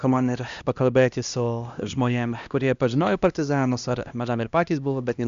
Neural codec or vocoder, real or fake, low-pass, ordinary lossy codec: codec, 16 kHz, 1 kbps, X-Codec, HuBERT features, trained on LibriSpeech; fake; 7.2 kHz; Opus, 64 kbps